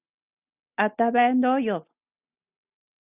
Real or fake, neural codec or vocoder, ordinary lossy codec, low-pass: real; none; Opus, 64 kbps; 3.6 kHz